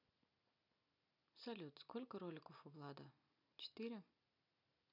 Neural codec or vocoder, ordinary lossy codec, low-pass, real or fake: none; none; 5.4 kHz; real